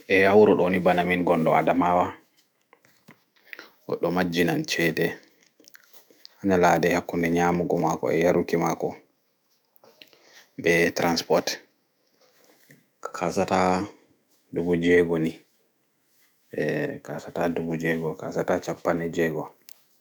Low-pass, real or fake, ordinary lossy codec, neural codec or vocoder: none; fake; none; autoencoder, 48 kHz, 128 numbers a frame, DAC-VAE, trained on Japanese speech